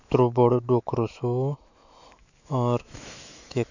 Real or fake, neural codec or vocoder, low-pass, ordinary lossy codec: fake; autoencoder, 48 kHz, 128 numbers a frame, DAC-VAE, trained on Japanese speech; 7.2 kHz; none